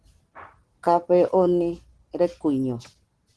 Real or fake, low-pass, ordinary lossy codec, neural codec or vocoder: real; 10.8 kHz; Opus, 16 kbps; none